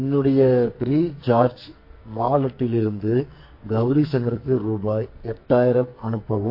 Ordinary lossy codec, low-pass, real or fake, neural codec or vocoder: MP3, 32 kbps; 5.4 kHz; fake; codec, 44.1 kHz, 2.6 kbps, SNAC